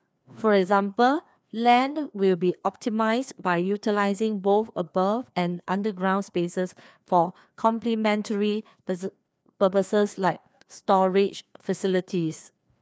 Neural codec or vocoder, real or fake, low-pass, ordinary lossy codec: codec, 16 kHz, 2 kbps, FreqCodec, larger model; fake; none; none